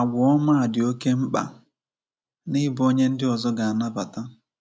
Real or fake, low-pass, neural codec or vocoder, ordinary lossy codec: real; none; none; none